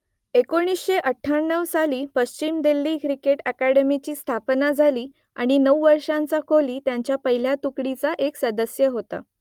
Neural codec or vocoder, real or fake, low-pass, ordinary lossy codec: none; real; 19.8 kHz; Opus, 32 kbps